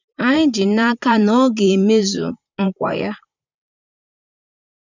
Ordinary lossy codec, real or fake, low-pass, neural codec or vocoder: none; fake; 7.2 kHz; vocoder, 44.1 kHz, 128 mel bands, Pupu-Vocoder